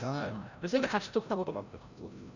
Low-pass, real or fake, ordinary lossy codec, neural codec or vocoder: 7.2 kHz; fake; none; codec, 16 kHz, 0.5 kbps, FreqCodec, larger model